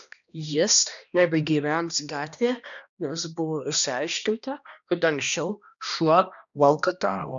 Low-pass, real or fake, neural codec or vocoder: 7.2 kHz; fake; codec, 16 kHz, 1 kbps, X-Codec, HuBERT features, trained on balanced general audio